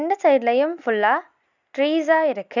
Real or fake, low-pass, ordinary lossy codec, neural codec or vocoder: real; 7.2 kHz; none; none